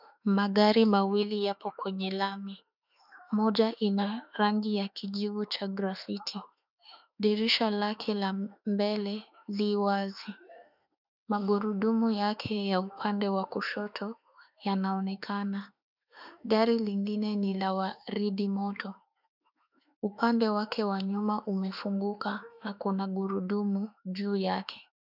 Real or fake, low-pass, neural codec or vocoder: fake; 5.4 kHz; autoencoder, 48 kHz, 32 numbers a frame, DAC-VAE, trained on Japanese speech